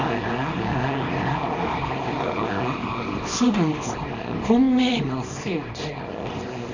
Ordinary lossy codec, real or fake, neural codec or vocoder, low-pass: Opus, 64 kbps; fake; codec, 24 kHz, 0.9 kbps, WavTokenizer, small release; 7.2 kHz